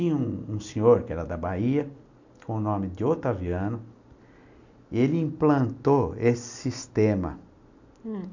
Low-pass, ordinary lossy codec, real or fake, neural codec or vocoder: 7.2 kHz; none; real; none